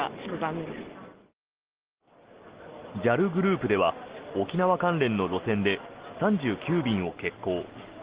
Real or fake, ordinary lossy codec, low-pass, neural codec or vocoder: real; Opus, 16 kbps; 3.6 kHz; none